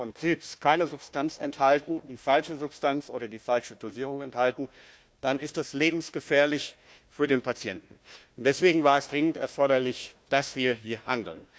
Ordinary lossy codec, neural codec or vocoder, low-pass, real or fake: none; codec, 16 kHz, 1 kbps, FunCodec, trained on Chinese and English, 50 frames a second; none; fake